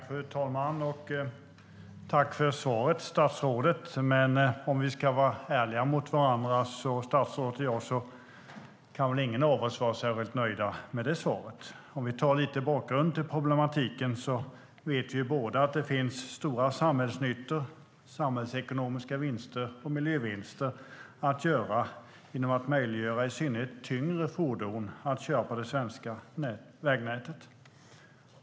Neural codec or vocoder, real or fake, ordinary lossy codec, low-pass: none; real; none; none